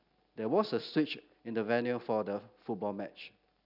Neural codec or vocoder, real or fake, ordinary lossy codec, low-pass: none; real; none; 5.4 kHz